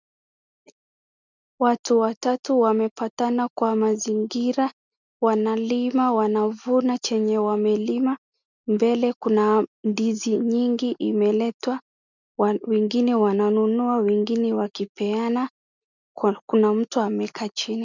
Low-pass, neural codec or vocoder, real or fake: 7.2 kHz; none; real